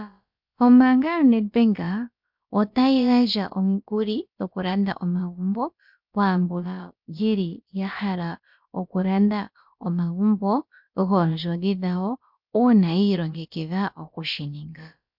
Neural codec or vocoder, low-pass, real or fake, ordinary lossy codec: codec, 16 kHz, about 1 kbps, DyCAST, with the encoder's durations; 5.4 kHz; fake; MP3, 48 kbps